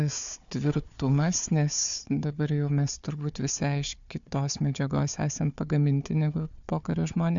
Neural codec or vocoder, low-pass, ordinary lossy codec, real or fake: codec, 16 kHz, 4 kbps, FunCodec, trained on Chinese and English, 50 frames a second; 7.2 kHz; MP3, 64 kbps; fake